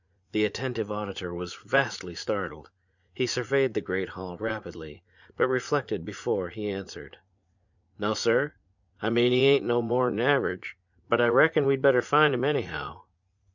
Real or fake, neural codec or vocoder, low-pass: fake; vocoder, 44.1 kHz, 80 mel bands, Vocos; 7.2 kHz